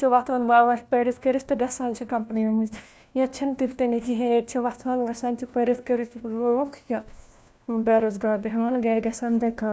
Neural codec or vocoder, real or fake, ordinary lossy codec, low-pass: codec, 16 kHz, 1 kbps, FunCodec, trained on LibriTTS, 50 frames a second; fake; none; none